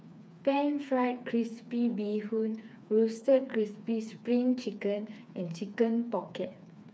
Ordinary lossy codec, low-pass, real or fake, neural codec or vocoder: none; none; fake; codec, 16 kHz, 4 kbps, FreqCodec, smaller model